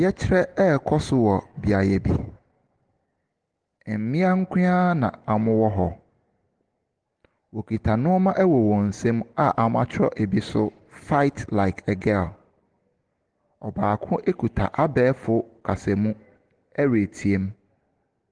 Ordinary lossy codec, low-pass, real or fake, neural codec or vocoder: Opus, 16 kbps; 9.9 kHz; real; none